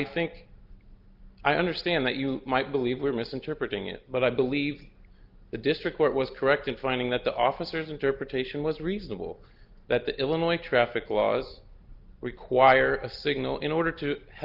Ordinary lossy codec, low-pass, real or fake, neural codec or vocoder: Opus, 32 kbps; 5.4 kHz; real; none